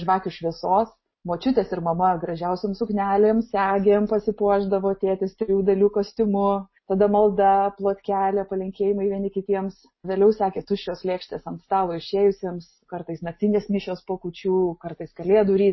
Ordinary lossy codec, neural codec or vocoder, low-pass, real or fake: MP3, 24 kbps; none; 7.2 kHz; real